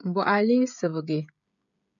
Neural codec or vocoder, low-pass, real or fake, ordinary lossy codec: codec, 16 kHz, 16 kbps, FreqCodec, smaller model; 7.2 kHz; fake; MP3, 64 kbps